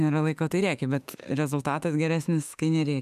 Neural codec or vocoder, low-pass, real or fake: autoencoder, 48 kHz, 32 numbers a frame, DAC-VAE, trained on Japanese speech; 14.4 kHz; fake